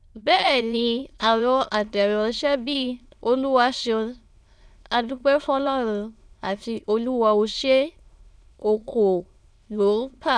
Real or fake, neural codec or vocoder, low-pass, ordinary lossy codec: fake; autoencoder, 22.05 kHz, a latent of 192 numbers a frame, VITS, trained on many speakers; none; none